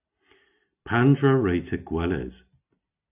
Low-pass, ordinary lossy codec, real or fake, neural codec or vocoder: 3.6 kHz; AAC, 32 kbps; real; none